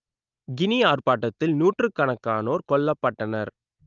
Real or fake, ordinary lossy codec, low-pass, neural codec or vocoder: real; Opus, 24 kbps; 7.2 kHz; none